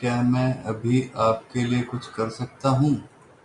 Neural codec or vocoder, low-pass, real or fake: none; 10.8 kHz; real